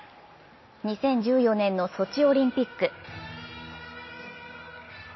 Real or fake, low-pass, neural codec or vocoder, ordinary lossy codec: real; 7.2 kHz; none; MP3, 24 kbps